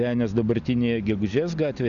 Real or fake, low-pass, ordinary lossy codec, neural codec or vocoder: real; 7.2 kHz; Opus, 64 kbps; none